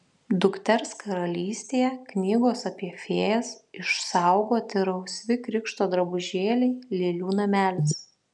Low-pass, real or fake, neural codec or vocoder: 10.8 kHz; real; none